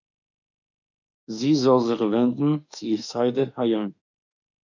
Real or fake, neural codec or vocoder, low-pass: fake; autoencoder, 48 kHz, 32 numbers a frame, DAC-VAE, trained on Japanese speech; 7.2 kHz